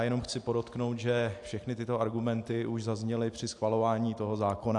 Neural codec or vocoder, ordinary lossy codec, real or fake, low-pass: none; AAC, 64 kbps; real; 10.8 kHz